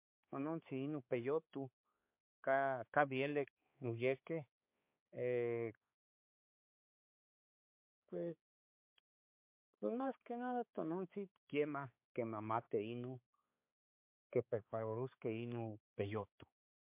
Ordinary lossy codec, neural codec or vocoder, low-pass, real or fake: MP3, 32 kbps; codec, 16 kHz, 4 kbps, X-Codec, HuBERT features, trained on balanced general audio; 3.6 kHz; fake